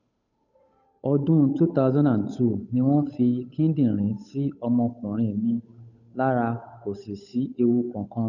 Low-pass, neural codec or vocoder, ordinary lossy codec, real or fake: 7.2 kHz; codec, 16 kHz, 8 kbps, FunCodec, trained on Chinese and English, 25 frames a second; none; fake